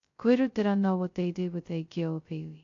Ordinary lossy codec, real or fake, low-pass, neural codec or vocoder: AAC, 48 kbps; fake; 7.2 kHz; codec, 16 kHz, 0.2 kbps, FocalCodec